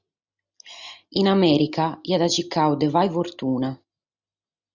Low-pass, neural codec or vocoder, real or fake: 7.2 kHz; none; real